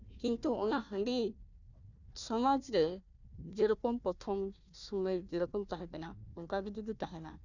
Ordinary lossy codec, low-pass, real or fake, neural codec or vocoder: none; 7.2 kHz; fake; codec, 16 kHz, 1 kbps, FunCodec, trained on Chinese and English, 50 frames a second